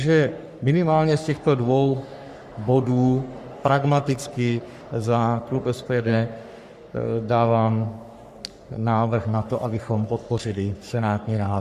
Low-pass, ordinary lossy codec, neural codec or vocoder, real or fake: 14.4 kHz; Opus, 64 kbps; codec, 44.1 kHz, 3.4 kbps, Pupu-Codec; fake